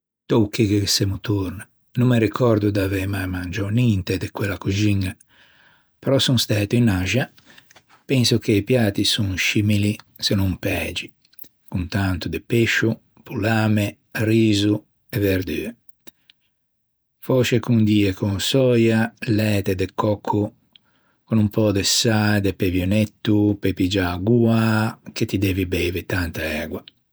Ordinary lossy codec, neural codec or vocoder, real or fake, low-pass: none; vocoder, 48 kHz, 128 mel bands, Vocos; fake; none